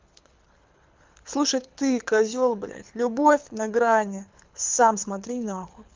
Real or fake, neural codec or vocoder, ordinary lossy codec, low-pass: fake; codec, 24 kHz, 6 kbps, HILCodec; Opus, 24 kbps; 7.2 kHz